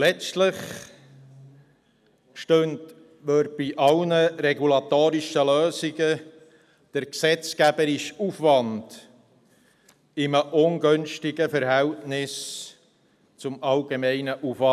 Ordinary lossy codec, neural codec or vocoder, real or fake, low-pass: none; none; real; 14.4 kHz